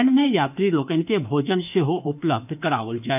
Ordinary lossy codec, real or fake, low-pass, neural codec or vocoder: none; fake; 3.6 kHz; autoencoder, 48 kHz, 32 numbers a frame, DAC-VAE, trained on Japanese speech